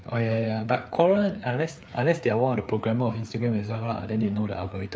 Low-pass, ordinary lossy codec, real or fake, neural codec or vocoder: none; none; fake; codec, 16 kHz, 4 kbps, FreqCodec, larger model